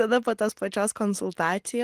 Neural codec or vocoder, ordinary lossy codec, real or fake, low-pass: none; Opus, 24 kbps; real; 14.4 kHz